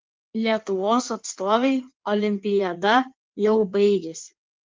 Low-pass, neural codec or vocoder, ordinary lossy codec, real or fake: 7.2 kHz; codec, 16 kHz in and 24 kHz out, 1.1 kbps, FireRedTTS-2 codec; Opus, 32 kbps; fake